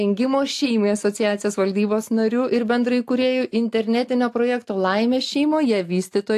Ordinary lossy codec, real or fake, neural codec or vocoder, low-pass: AAC, 64 kbps; real; none; 14.4 kHz